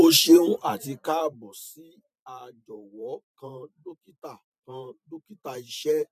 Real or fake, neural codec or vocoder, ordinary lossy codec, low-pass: fake; vocoder, 44.1 kHz, 128 mel bands every 512 samples, BigVGAN v2; none; 14.4 kHz